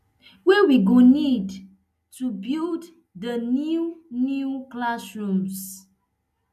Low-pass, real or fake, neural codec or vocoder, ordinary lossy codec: 14.4 kHz; real; none; AAC, 96 kbps